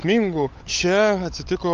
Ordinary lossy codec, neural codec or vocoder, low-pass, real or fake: Opus, 24 kbps; codec, 16 kHz, 16 kbps, FunCodec, trained on LibriTTS, 50 frames a second; 7.2 kHz; fake